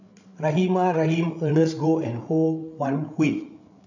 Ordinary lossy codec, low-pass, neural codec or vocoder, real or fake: none; 7.2 kHz; codec, 16 kHz, 8 kbps, FreqCodec, larger model; fake